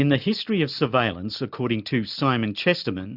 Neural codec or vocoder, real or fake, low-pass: none; real; 5.4 kHz